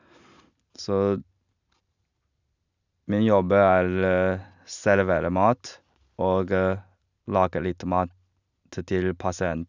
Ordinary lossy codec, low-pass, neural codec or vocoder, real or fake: none; 7.2 kHz; none; real